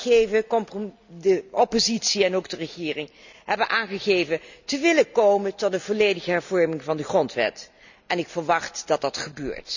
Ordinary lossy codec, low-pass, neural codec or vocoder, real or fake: none; 7.2 kHz; none; real